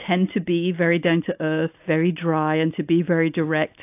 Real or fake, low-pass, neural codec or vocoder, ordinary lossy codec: real; 3.6 kHz; none; AAC, 32 kbps